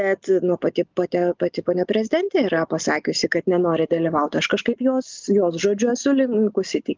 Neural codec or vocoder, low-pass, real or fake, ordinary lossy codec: none; 7.2 kHz; real; Opus, 24 kbps